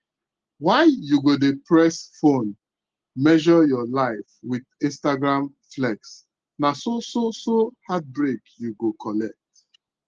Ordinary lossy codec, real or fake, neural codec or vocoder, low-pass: Opus, 16 kbps; real; none; 7.2 kHz